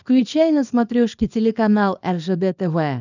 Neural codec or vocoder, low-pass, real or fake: codec, 24 kHz, 0.9 kbps, WavTokenizer, small release; 7.2 kHz; fake